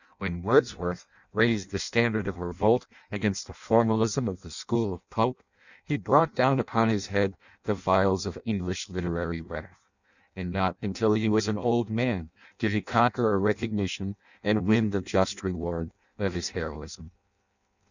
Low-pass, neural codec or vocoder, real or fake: 7.2 kHz; codec, 16 kHz in and 24 kHz out, 0.6 kbps, FireRedTTS-2 codec; fake